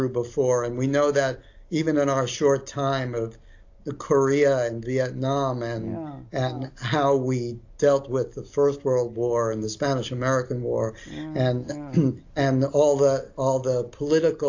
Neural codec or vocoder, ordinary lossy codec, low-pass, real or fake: none; AAC, 48 kbps; 7.2 kHz; real